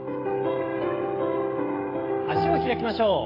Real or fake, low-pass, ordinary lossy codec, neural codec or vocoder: fake; 5.4 kHz; AAC, 24 kbps; codec, 16 kHz, 16 kbps, FreqCodec, smaller model